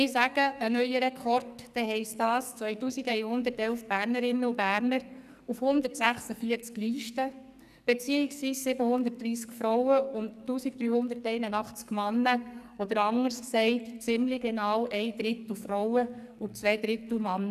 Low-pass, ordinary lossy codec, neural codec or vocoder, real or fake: 14.4 kHz; none; codec, 44.1 kHz, 2.6 kbps, SNAC; fake